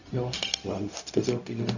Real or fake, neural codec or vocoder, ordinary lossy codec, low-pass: fake; codec, 16 kHz, 0.4 kbps, LongCat-Audio-Codec; none; 7.2 kHz